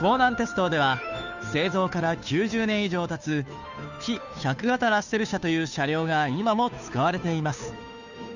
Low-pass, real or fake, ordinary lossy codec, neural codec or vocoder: 7.2 kHz; fake; none; codec, 16 kHz, 2 kbps, FunCodec, trained on Chinese and English, 25 frames a second